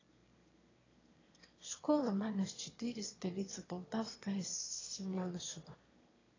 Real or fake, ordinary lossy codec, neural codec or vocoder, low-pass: fake; AAC, 32 kbps; autoencoder, 22.05 kHz, a latent of 192 numbers a frame, VITS, trained on one speaker; 7.2 kHz